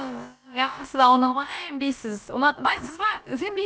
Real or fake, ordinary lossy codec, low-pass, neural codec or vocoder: fake; none; none; codec, 16 kHz, about 1 kbps, DyCAST, with the encoder's durations